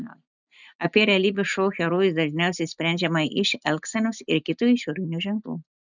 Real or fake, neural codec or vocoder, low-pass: real; none; 7.2 kHz